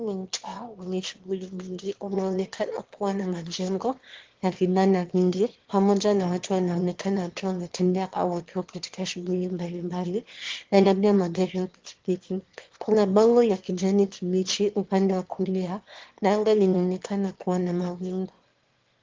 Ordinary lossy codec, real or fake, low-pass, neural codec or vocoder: Opus, 16 kbps; fake; 7.2 kHz; autoencoder, 22.05 kHz, a latent of 192 numbers a frame, VITS, trained on one speaker